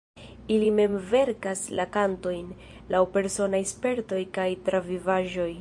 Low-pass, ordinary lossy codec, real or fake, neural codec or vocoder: 10.8 kHz; MP3, 64 kbps; fake; vocoder, 24 kHz, 100 mel bands, Vocos